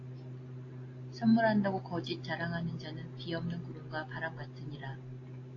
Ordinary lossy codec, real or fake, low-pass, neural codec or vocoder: AAC, 32 kbps; real; 7.2 kHz; none